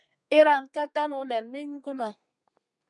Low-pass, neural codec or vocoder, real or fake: 10.8 kHz; codec, 32 kHz, 1.9 kbps, SNAC; fake